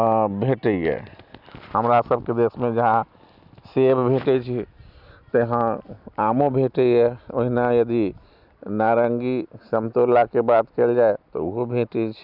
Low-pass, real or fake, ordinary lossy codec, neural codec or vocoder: 5.4 kHz; real; none; none